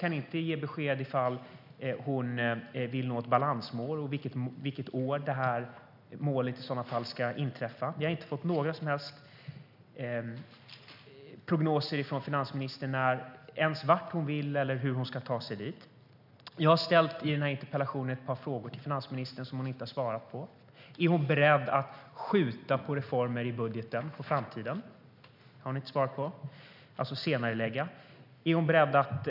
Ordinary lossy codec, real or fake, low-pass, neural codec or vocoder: none; real; 5.4 kHz; none